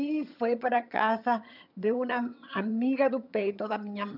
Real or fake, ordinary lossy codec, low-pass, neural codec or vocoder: fake; none; 5.4 kHz; vocoder, 22.05 kHz, 80 mel bands, HiFi-GAN